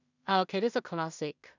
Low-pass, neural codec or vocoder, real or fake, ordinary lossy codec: 7.2 kHz; codec, 16 kHz in and 24 kHz out, 0.4 kbps, LongCat-Audio-Codec, two codebook decoder; fake; none